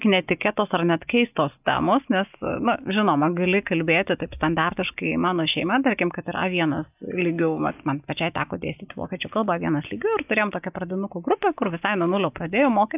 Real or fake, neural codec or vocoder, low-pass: real; none; 3.6 kHz